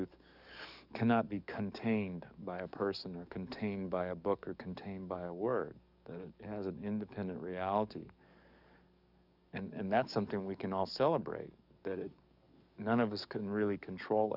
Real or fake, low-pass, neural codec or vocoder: fake; 5.4 kHz; codec, 44.1 kHz, 7.8 kbps, DAC